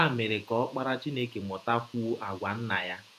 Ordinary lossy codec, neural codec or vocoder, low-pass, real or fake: none; vocoder, 48 kHz, 128 mel bands, Vocos; 14.4 kHz; fake